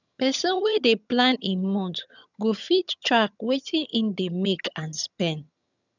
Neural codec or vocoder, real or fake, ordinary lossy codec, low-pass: vocoder, 22.05 kHz, 80 mel bands, HiFi-GAN; fake; none; 7.2 kHz